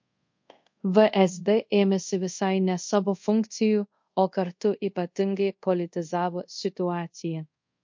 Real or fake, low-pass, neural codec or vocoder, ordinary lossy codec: fake; 7.2 kHz; codec, 24 kHz, 0.5 kbps, DualCodec; MP3, 48 kbps